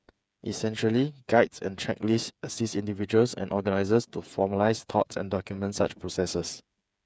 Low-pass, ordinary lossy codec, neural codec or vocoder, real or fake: none; none; codec, 16 kHz, 8 kbps, FreqCodec, smaller model; fake